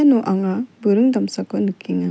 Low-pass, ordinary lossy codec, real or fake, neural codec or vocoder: none; none; real; none